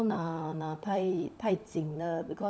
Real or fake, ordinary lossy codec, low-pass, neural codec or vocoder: fake; none; none; codec, 16 kHz, 16 kbps, FunCodec, trained on LibriTTS, 50 frames a second